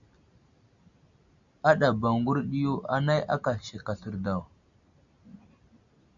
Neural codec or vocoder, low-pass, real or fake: none; 7.2 kHz; real